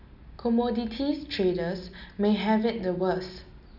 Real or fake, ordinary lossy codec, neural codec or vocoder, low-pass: real; none; none; 5.4 kHz